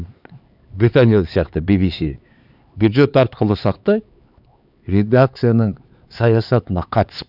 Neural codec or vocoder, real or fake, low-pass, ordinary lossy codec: codec, 16 kHz, 4 kbps, X-Codec, HuBERT features, trained on LibriSpeech; fake; 5.4 kHz; none